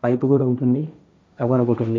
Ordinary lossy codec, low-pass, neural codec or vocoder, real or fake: none; none; codec, 16 kHz, 1.1 kbps, Voila-Tokenizer; fake